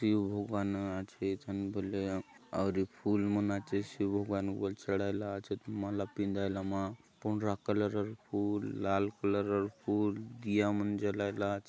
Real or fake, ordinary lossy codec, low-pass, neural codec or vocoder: real; none; none; none